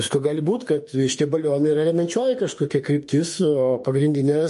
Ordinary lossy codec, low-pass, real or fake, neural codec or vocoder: MP3, 48 kbps; 14.4 kHz; fake; autoencoder, 48 kHz, 32 numbers a frame, DAC-VAE, trained on Japanese speech